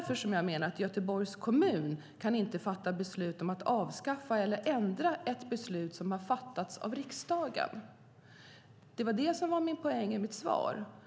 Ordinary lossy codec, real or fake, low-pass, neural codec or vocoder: none; real; none; none